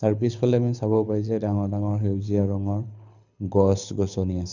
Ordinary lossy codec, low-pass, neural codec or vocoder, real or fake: none; 7.2 kHz; codec, 24 kHz, 6 kbps, HILCodec; fake